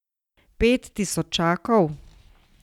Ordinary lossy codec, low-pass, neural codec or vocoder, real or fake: none; 19.8 kHz; none; real